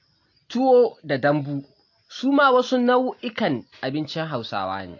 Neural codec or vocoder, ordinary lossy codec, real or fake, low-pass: none; AAC, 48 kbps; real; 7.2 kHz